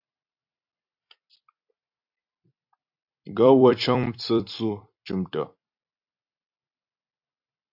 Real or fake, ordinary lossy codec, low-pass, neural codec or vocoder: fake; AAC, 48 kbps; 5.4 kHz; vocoder, 44.1 kHz, 128 mel bands every 256 samples, BigVGAN v2